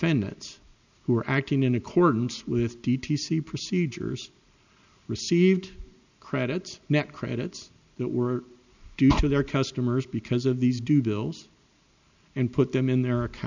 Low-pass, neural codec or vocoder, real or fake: 7.2 kHz; none; real